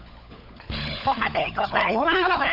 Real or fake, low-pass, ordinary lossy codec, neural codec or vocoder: fake; 5.4 kHz; none; codec, 16 kHz, 16 kbps, FunCodec, trained on LibriTTS, 50 frames a second